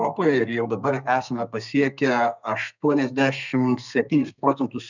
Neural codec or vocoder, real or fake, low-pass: codec, 32 kHz, 1.9 kbps, SNAC; fake; 7.2 kHz